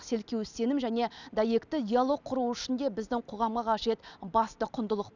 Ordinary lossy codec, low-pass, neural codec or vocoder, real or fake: none; 7.2 kHz; none; real